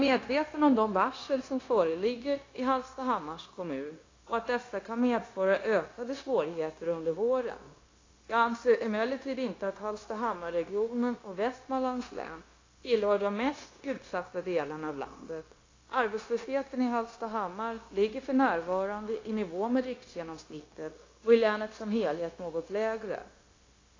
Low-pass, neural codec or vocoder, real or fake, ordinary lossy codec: 7.2 kHz; codec, 16 kHz, 0.9 kbps, LongCat-Audio-Codec; fake; AAC, 32 kbps